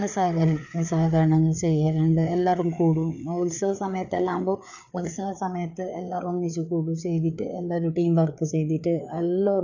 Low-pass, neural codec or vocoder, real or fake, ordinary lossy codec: 7.2 kHz; codec, 16 kHz, 4 kbps, FreqCodec, larger model; fake; none